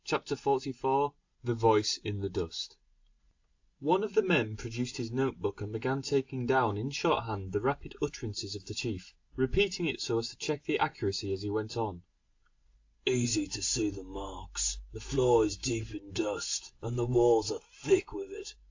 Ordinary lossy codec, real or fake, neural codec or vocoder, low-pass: AAC, 48 kbps; real; none; 7.2 kHz